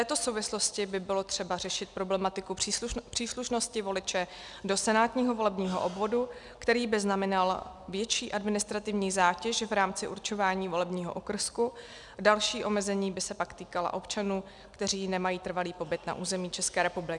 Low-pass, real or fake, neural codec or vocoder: 10.8 kHz; real; none